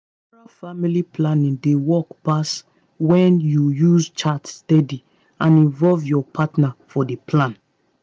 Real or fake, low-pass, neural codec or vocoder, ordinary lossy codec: real; none; none; none